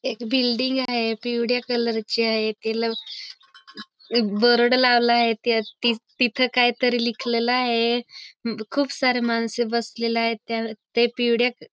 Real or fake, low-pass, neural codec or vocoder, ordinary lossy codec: real; none; none; none